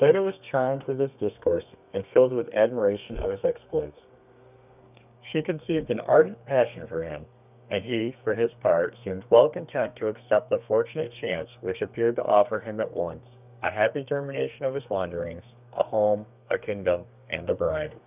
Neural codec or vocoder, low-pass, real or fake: codec, 32 kHz, 1.9 kbps, SNAC; 3.6 kHz; fake